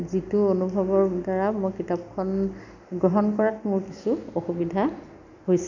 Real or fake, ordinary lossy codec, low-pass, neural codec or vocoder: real; none; 7.2 kHz; none